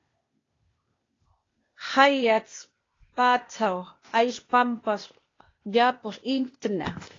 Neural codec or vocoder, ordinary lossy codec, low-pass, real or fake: codec, 16 kHz, 0.8 kbps, ZipCodec; AAC, 32 kbps; 7.2 kHz; fake